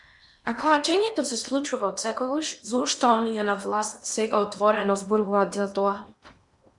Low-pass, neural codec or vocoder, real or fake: 10.8 kHz; codec, 16 kHz in and 24 kHz out, 0.8 kbps, FocalCodec, streaming, 65536 codes; fake